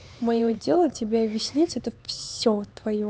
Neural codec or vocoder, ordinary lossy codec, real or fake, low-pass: codec, 16 kHz, 4 kbps, X-Codec, HuBERT features, trained on LibriSpeech; none; fake; none